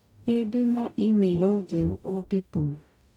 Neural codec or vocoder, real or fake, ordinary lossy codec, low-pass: codec, 44.1 kHz, 0.9 kbps, DAC; fake; none; 19.8 kHz